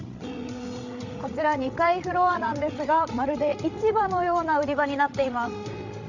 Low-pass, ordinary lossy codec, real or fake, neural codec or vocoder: 7.2 kHz; none; fake; codec, 16 kHz, 16 kbps, FreqCodec, larger model